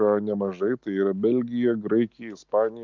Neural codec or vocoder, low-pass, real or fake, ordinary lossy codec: none; 7.2 kHz; real; MP3, 64 kbps